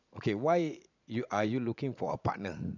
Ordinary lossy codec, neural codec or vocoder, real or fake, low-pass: none; vocoder, 44.1 kHz, 80 mel bands, Vocos; fake; 7.2 kHz